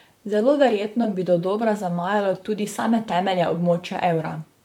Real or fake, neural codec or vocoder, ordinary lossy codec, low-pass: fake; vocoder, 44.1 kHz, 128 mel bands, Pupu-Vocoder; MP3, 96 kbps; 19.8 kHz